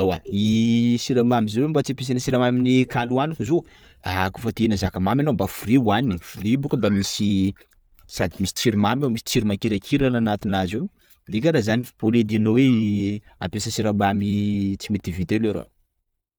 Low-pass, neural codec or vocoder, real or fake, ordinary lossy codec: none; none; real; none